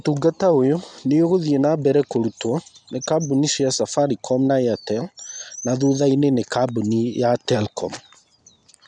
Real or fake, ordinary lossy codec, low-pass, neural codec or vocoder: real; none; 9.9 kHz; none